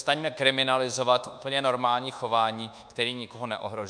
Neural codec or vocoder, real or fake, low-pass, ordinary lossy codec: codec, 24 kHz, 1.2 kbps, DualCodec; fake; 9.9 kHz; MP3, 96 kbps